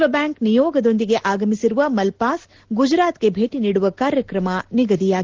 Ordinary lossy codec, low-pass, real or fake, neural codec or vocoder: Opus, 16 kbps; 7.2 kHz; real; none